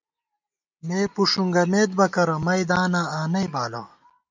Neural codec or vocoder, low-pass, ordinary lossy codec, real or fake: none; 7.2 kHz; MP3, 64 kbps; real